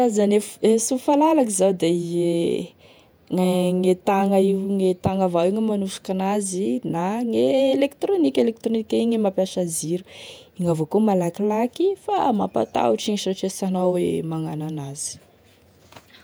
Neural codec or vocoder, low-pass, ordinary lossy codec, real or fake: vocoder, 48 kHz, 128 mel bands, Vocos; none; none; fake